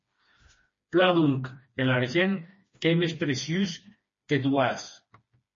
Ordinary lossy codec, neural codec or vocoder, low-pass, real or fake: MP3, 32 kbps; codec, 16 kHz, 2 kbps, FreqCodec, smaller model; 7.2 kHz; fake